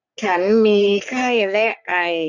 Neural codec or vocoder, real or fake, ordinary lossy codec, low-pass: codec, 44.1 kHz, 3.4 kbps, Pupu-Codec; fake; none; 7.2 kHz